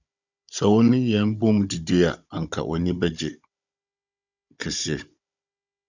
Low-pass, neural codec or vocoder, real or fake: 7.2 kHz; codec, 16 kHz, 16 kbps, FunCodec, trained on Chinese and English, 50 frames a second; fake